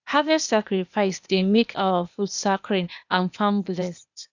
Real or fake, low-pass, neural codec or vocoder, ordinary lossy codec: fake; 7.2 kHz; codec, 16 kHz, 0.8 kbps, ZipCodec; none